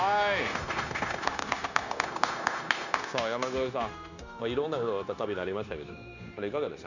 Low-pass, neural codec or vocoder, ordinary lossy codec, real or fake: 7.2 kHz; codec, 16 kHz, 0.9 kbps, LongCat-Audio-Codec; none; fake